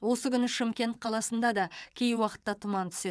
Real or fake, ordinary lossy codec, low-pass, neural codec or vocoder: fake; none; none; vocoder, 22.05 kHz, 80 mel bands, WaveNeXt